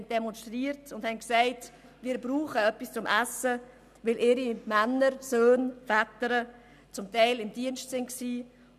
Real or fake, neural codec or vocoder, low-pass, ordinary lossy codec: real; none; 14.4 kHz; none